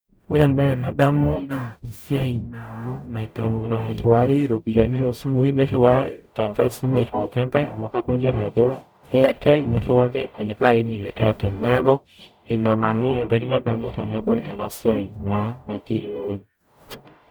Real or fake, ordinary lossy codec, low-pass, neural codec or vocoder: fake; none; none; codec, 44.1 kHz, 0.9 kbps, DAC